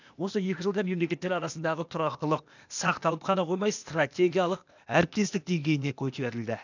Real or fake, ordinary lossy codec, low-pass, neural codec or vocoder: fake; none; 7.2 kHz; codec, 16 kHz, 0.8 kbps, ZipCodec